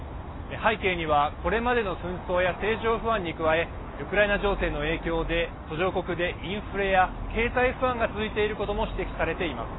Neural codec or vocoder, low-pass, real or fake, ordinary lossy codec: none; 7.2 kHz; real; AAC, 16 kbps